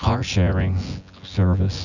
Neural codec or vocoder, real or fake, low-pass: vocoder, 24 kHz, 100 mel bands, Vocos; fake; 7.2 kHz